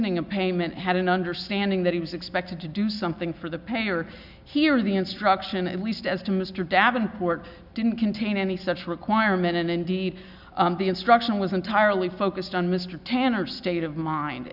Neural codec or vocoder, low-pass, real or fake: none; 5.4 kHz; real